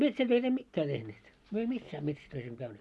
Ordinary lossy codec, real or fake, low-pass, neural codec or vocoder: none; real; none; none